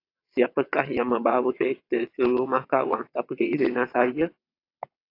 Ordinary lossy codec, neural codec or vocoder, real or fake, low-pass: AAC, 32 kbps; vocoder, 22.05 kHz, 80 mel bands, WaveNeXt; fake; 5.4 kHz